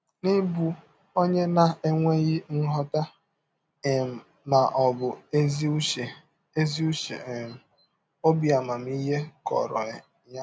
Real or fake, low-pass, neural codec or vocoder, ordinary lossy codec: real; none; none; none